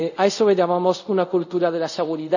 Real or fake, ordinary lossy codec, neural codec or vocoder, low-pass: fake; none; codec, 24 kHz, 0.5 kbps, DualCodec; 7.2 kHz